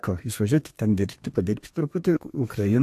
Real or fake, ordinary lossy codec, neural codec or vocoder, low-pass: fake; AAC, 64 kbps; codec, 32 kHz, 1.9 kbps, SNAC; 14.4 kHz